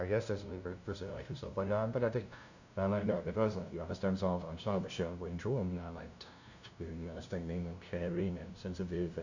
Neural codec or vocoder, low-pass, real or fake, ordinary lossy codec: codec, 16 kHz, 0.5 kbps, FunCodec, trained on LibriTTS, 25 frames a second; 7.2 kHz; fake; none